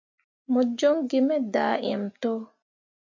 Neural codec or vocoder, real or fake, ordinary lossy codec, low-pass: none; real; MP3, 48 kbps; 7.2 kHz